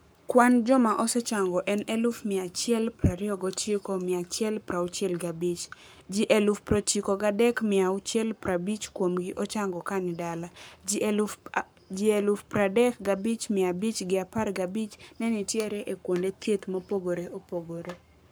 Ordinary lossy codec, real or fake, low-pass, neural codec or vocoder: none; fake; none; codec, 44.1 kHz, 7.8 kbps, Pupu-Codec